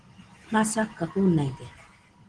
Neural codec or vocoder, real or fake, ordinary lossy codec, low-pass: none; real; Opus, 16 kbps; 9.9 kHz